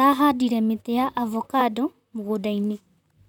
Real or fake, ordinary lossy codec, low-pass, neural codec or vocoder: fake; none; 19.8 kHz; vocoder, 44.1 kHz, 128 mel bands, Pupu-Vocoder